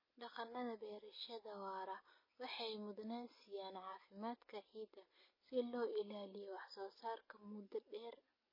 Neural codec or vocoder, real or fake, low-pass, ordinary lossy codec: none; real; 5.4 kHz; MP3, 24 kbps